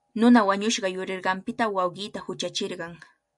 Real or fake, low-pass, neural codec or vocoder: real; 10.8 kHz; none